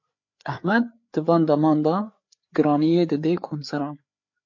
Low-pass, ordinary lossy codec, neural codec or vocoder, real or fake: 7.2 kHz; MP3, 48 kbps; codec, 16 kHz, 4 kbps, FreqCodec, larger model; fake